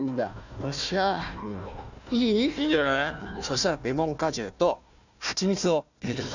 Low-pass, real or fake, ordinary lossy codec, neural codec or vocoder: 7.2 kHz; fake; none; codec, 16 kHz, 1 kbps, FunCodec, trained on Chinese and English, 50 frames a second